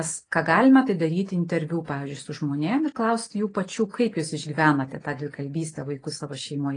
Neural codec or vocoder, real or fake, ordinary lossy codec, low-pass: none; real; AAC, 32 kbps; 9.9 kHz